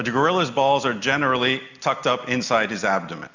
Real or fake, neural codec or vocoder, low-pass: real; none; 7.2 kHz